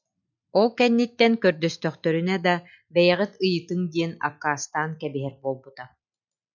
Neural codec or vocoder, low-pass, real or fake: none; 7.2 kHz; real